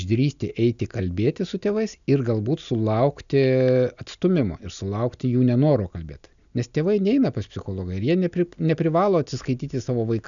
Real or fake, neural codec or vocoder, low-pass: real; none; 7.2 kHz